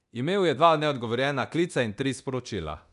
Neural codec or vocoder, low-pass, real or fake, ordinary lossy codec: codec, 24 kHz, 0.9 kbps, DualCodec; 10.8 kHz; fake; none